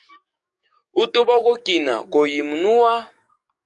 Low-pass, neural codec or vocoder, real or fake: 10.8 kHz; codec, 44.1 kHz, 7.8 kbps, DAC; fake